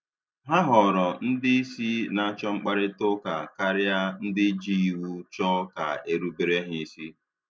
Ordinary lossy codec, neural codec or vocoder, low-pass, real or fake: none; none; none; real